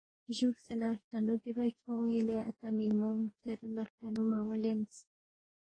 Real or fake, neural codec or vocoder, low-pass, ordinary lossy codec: fake; codec, 44.1 kHz, 2.6 kbps, DAC; 9.9 kHz; AAC, 32 kbps